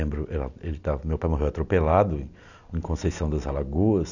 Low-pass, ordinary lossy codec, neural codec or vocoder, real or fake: 7.2 kHz; none; none; real